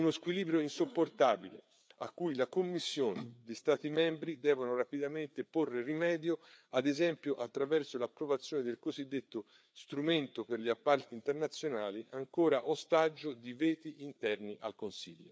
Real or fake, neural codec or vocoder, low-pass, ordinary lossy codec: fake; codec, 16 kHz, 4 kbps, FreqCodec, larger model; none; none